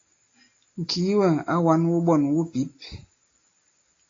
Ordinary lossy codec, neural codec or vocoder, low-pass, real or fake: AAC, 32 kbps; none; 7.2 kHz; real